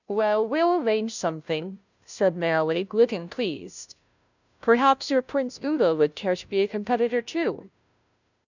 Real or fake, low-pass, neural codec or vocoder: fake; 7.2 kHz; codec, 16 kHz, 0.5 kbps, FunCodec, trained on Chinese and English, 25 frames a second